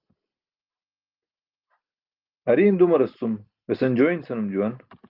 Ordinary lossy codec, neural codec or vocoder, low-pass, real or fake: Opus, 24 kbps; none; 5.4 kHz; real